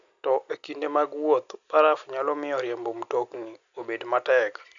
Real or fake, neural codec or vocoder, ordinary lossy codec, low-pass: real; none; none; 7.2 kHz